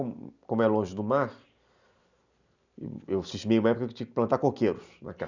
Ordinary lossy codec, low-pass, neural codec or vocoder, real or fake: none; 7.2 kHz; none; real